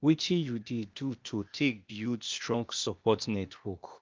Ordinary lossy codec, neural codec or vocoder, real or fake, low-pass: Opus, 24 kbps; codec, 16 kHz, 0.8 kbps, ZipCodec; fake; 7.2 kHz